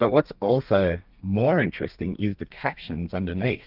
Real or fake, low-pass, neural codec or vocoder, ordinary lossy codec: fake; 5.4 kHz; codec, 32 kHz, 1.9 kbps, SNAC; Opus, 24 kbps